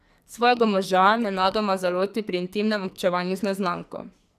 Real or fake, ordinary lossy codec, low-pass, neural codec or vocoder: fake; none; 14.4 kHz; codec, 44.1 kHz, 2.6 kbps, SNAC